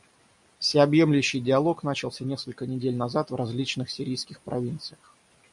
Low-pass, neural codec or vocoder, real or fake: 10.8 kHz; none; real